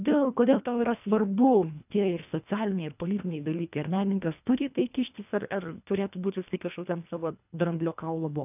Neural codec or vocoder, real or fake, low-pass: codec, 24 kHz, 1.5 kbps, HILCodec; fake; 3.6 kHz